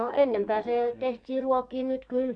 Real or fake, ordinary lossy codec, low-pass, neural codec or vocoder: fake; none; 9.9 kHz; codec, 44.1 kHz, 2.6 kbps, SNAC